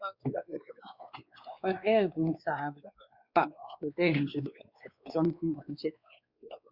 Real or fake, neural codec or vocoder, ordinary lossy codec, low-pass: fake; codec, 16 kHz, 2 kbps, X-Codec, WavLM features, trained on Multilingual LibriSpeech; Opus, 64 kbps; 5.4 kHz